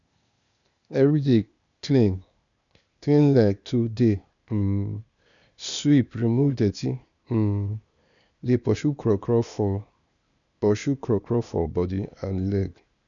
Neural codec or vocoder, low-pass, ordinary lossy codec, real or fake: codec, 16 kHz, 0.8 kbps, ZipCodec; 7.2 kHz; none; fake